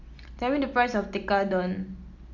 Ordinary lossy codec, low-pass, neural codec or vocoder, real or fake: Opus, 64 kbps; 7.2 kHz; none; real